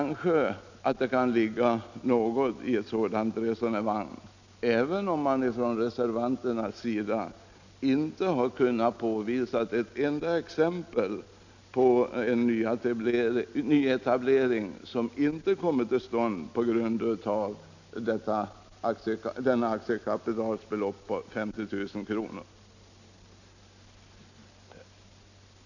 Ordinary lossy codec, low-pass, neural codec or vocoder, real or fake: none; 7.2 kHz; none; real